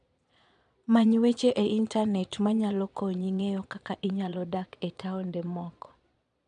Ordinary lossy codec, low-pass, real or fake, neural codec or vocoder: none; 9.9 kHz; fake; vocoder, 22.05 kHz, 80 mel bands, Vocos